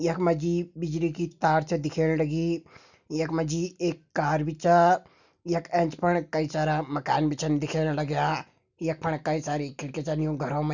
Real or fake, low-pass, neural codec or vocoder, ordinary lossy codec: real; 7.2 kHz; none; none